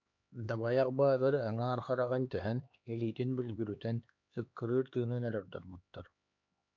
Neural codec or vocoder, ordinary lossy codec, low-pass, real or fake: codec, 16 kHz, 2 kbps, X-Codec, HuBERT features, trained on LibriSpeech; MP3, 64 kbps; 7.2 kHz; fake